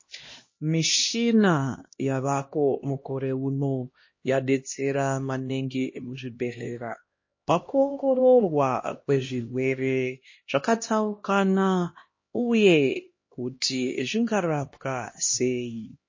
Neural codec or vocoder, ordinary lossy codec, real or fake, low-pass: codec, 16 kHz, 1 kbps, X-Codec, HuBERT features, trained on LibriSpeech; MP3, 32 kbps; fake; 7.2 kHz